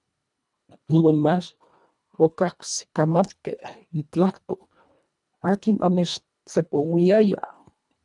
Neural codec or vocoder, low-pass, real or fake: codec, 24 kHz, 1.5 kbps, HILCodec; 10.8 kHz; fake